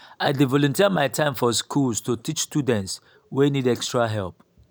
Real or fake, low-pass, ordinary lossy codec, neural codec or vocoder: real; none; none; none